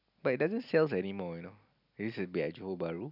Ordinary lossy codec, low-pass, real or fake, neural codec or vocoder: none; 5.4 kHz; real; none